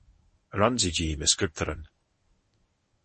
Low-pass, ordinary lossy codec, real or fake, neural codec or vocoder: 10.8 kHz; MP3, 32 kbps; fake; codec, 24 kHz, 0.9 kbps, WavTokenizer, medium speech release version 1